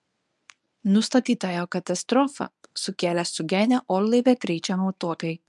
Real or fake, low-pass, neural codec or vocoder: fake; 10.8 kHz; codec, 24 kHz, 0.9 kbps, WavTokenizer, medium speech release version 1